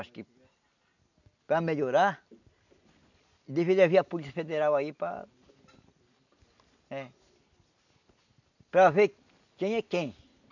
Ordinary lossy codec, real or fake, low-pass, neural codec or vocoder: none; real; 7.2 kHz; none